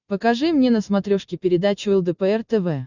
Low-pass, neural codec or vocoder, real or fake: 7.2 kHz; none; real